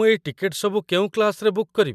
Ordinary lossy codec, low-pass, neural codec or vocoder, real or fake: MP3, 96 kbps; 14.4 kHz; none; real